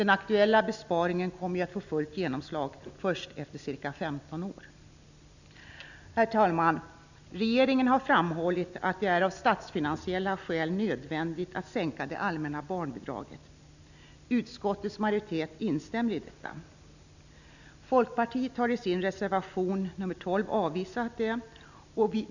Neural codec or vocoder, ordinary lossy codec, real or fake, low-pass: none; none; real; 7.2 kHz